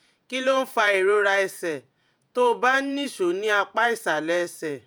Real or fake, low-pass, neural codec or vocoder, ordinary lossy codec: fake; none; vocoder, 48 kHz, 128 mel bands, Vocos; none